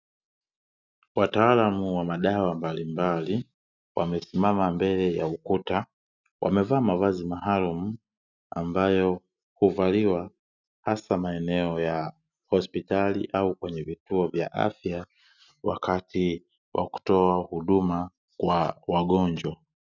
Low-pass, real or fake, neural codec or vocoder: 7.2 kHz; real; none